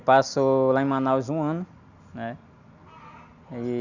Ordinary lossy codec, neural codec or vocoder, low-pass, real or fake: none; none; 7.2 kHz; real